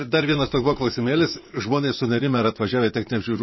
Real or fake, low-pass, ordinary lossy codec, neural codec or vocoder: fake; 7.2 kHz; MP3, 24 kbps; vocoder, 24 kHz, 100 mel bands, Vocos